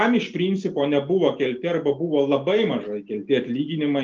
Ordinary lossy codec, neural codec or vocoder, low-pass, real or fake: Opus, 24 kbps; none; 7.2 kHz; real